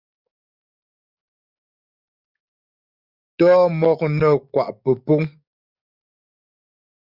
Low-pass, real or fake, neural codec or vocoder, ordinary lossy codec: 5.4 kHz; fake; codec, 44.1 kHz, 7.8 kbps, DAC; Opus, 64 kbps